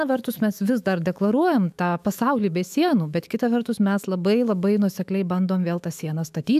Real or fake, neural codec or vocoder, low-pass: fake; autoencoder, 48 kHz, 128 numbers a frame, DAC-VAE, trained on Japanese speech; 14.4 kHz